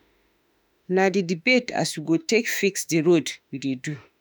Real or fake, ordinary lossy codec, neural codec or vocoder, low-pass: fake; none; autoencoder, 48 kHz, 32 numbers a frame, DAC-VAE, trained on Japanese speech; none